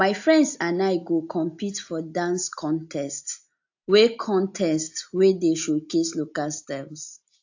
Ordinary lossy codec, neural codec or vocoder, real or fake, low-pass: AAC, 48 kbps; none; real; 7.2 kHz